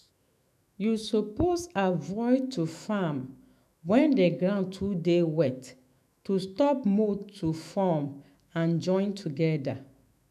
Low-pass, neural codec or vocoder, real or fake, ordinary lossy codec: 14.4 kHz; autoencoder, 48 kHz, 128 numbers a frame, DAC-VAE, trained on Japanese speech; fake; none